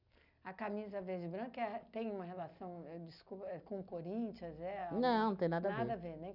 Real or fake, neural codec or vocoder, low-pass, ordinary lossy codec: real; none; 5.4 kHz; none